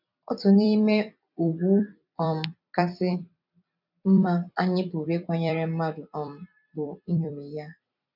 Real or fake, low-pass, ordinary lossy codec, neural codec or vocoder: fake; 5.4 kHz; none; vocoder, 44.1 kHz, 128 mel bands every 256 samples, BigVGAN v2